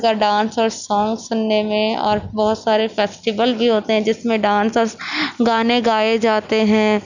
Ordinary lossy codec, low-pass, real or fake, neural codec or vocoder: none; 7.2 kHz; real; none